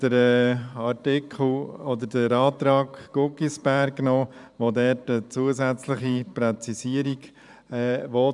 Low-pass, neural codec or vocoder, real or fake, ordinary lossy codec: 10.8 kHz; vocoder, 44.1 kHz, 128 mel bands every 512 samples, BigVGAN v2; fake; none